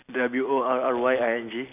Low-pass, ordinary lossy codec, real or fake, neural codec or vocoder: 3.6 kHz; none; real; none